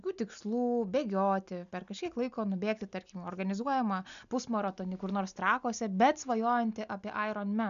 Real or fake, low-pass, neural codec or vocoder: real; 7.2 kHz; none